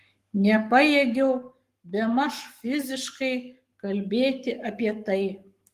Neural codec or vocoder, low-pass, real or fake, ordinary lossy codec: codec, 44.1 kHz, 7.8 kbps, Pupu-Codec; 14.4 kHz; fake; Opus, 24 kbps